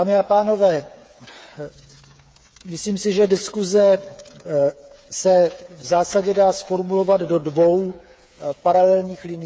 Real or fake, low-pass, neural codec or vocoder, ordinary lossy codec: fake; none; codec, 16 kHz, 8 kbps, FreqCodec, smaller model; none